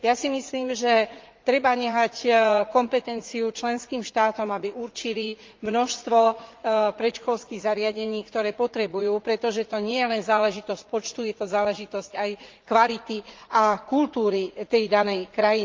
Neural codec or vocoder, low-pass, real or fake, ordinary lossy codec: vocoder, 44.1 kHz, 80 mel bands, Vocos; 7.2 kHz; fake; Opus, 32 kbps